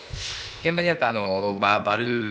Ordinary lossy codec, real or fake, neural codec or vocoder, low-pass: none; fake; codec, 16 kHz, 0.8 kbps, ZipCodec; none